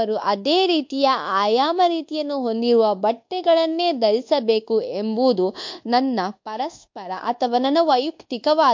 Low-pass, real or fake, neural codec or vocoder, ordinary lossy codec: 7.2 kHz; fake; codec, 24 kHz, 1.2 kbps, DualCodec; MP3, 48 kbps